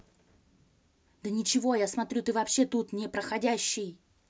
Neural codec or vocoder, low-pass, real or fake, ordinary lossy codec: none; none; real; none